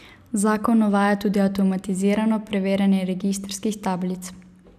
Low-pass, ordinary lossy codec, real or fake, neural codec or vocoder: 14.4 kHz; none; real; none